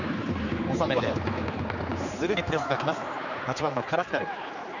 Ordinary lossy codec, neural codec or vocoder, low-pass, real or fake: none; codec, 16 kHz, 4 kbps, X-Codec, HuBERT features, trained on general audio; 7.2 kHz; fake